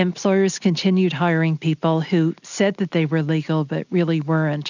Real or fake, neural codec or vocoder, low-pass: real; none; 7.2 kHz